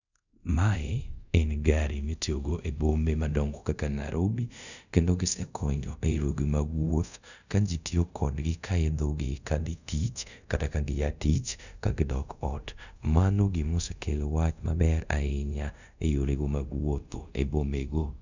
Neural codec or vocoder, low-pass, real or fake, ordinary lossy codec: codec, 24 kHz, 0.5 kbps, DualCodec; 7.2 kHz; fake; none